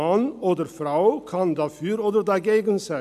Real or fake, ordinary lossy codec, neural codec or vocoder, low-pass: real; none; none; 14.4 kHz